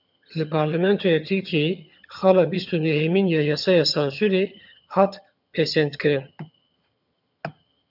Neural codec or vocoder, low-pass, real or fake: vocoder, 22.05 kHz, 80 mel bands, HiFi-GAN; 5.4 kHz; fake